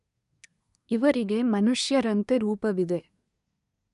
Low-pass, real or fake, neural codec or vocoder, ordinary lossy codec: 10.8 kHz; fake; codec, 24 kHz, 1 kbps, SNAC; none